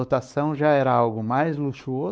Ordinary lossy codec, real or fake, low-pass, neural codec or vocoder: none; fake; none; codec, 16 kHz, 4 kbps, X-Codec, WavLM features, trained on Multilingual LibriSpeech